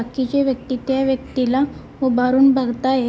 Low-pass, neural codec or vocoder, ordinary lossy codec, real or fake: none; none; none; real